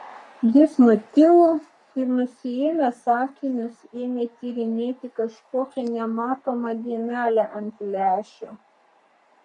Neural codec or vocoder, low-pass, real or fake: codec, 44.1 kHz, 3.4 kbps, Pupu-Codec; 10.8 kHz; fake